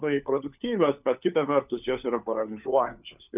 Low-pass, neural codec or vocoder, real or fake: 3.6 kHz; codec, 16 kHz, 4 kbps, FunCodec, trained on LibriTTS, 50 frames a second; fake